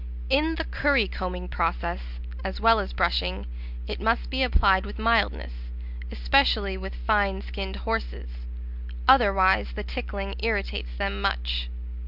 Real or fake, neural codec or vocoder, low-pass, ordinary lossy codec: real; none; 5.4 kHz; Opus, 64 kbps